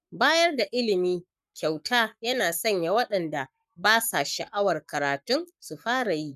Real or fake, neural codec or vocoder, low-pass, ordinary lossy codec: fake; codec, 44.1 kHz, 7.8 kbps, Pupu-Codec; 14.4 kHz; none